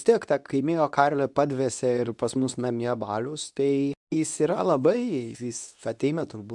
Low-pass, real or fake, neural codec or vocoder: 10.8 kHz; fake; codec, 24 kHz, 0.9 kbps, WavTokenizer, medium speech release version 2